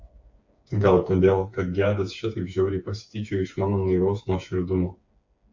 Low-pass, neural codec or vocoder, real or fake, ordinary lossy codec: 7.2 kHz; codec, 16 kHz, 4 kbps, FreqCodec, smaller model; fake; MP3, 48 kbps